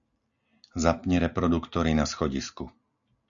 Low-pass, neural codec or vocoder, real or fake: 7.2 kHz; none; real